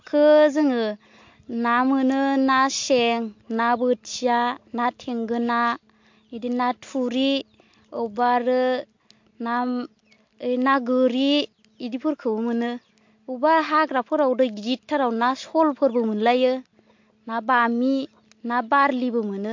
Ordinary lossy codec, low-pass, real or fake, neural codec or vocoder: MP3, 48 kbps; 7.2 kHz; real; none